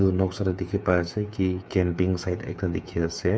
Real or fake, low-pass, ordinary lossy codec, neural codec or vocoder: fake; none; none; codec, 16 kHz, 8 kbps, FreqCodec, smaller model